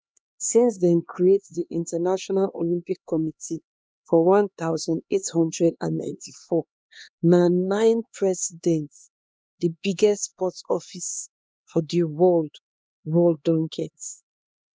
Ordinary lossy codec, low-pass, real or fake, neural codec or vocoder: none; none; fake; codec, 16 kHz, 2 kbps, X-Codec, HuBERT features, trained on LibriSpeech